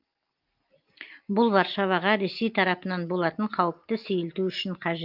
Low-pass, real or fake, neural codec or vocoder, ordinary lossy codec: 5.4 kHz; real; none; Opus, 32 kbps